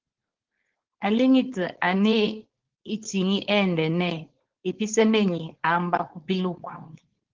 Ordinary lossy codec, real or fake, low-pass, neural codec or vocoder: Opus, 16 kbps; fake; 7.2 kHz; codec, 16 kHz, 4.8 kbps, FACodec